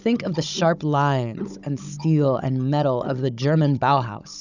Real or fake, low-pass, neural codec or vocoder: fake; 7.2 kHz; codec, 16 kHz, 16 kbps, FunCodec, trained on Chinese and English, 50 frames a second